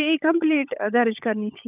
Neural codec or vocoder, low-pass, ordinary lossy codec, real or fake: codec, 16 kHz, 16 kbps, FunCodec, trained on LibriTTS, 50 frames a second; 3.6 kHz; none; fake